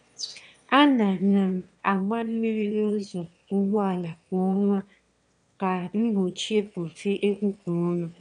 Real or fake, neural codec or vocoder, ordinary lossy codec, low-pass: fake; autoencoder, 22.05 kHz, a latent of 192 numbers a frame, VITS, trained on one speaker; none; 9.9 kHz